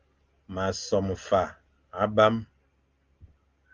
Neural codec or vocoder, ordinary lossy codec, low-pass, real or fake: none; Opus, 24 kbps; 7.2 kHz; real